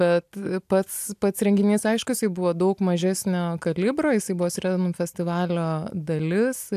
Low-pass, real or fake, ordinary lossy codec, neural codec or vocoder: 14.4 kHz; real; AAC, 96 kbps; none